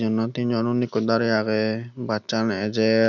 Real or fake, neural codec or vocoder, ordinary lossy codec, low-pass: real; none; none; 7.2 kHz